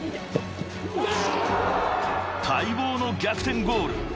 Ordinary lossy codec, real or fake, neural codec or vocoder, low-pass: none; real; none; none